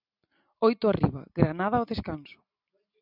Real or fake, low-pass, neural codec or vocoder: real; 5.4 kHz; none